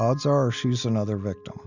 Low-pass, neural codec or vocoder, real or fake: 7.2 kHz; none; real